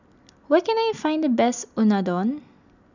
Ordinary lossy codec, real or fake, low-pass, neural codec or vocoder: none; real; 7.2 kHz; none